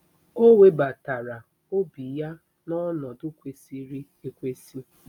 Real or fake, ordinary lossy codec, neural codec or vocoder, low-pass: real; none; none; 19.8 kHz